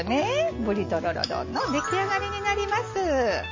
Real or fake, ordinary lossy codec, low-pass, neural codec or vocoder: real; MP3, 32 kbps; 7.2 kHz; none